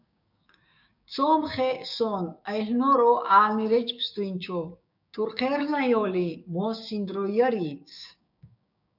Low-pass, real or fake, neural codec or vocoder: 5.4 kHz; fake; codec, 16 kHz, 6 kbps, DAC